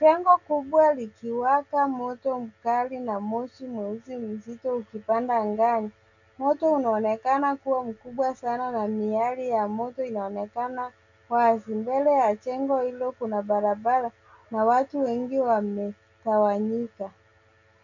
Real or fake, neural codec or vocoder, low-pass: real; none; 7.2 kHz